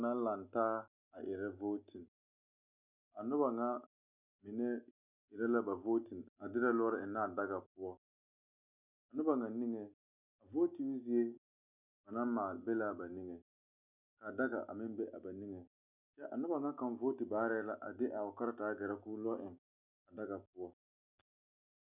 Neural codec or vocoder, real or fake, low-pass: none; real; 3.6 kHz